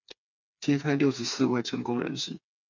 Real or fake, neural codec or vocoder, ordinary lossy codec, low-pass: fake; codec, 16 kHz, 4 kbps, FreqCodec, smaller model; MP3, 64 kbps; 7.2 kHz